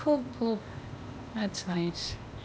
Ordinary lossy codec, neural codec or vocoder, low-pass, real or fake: none; codec, 16 kHz, 0.8 kbps, ZipCodec; none; fake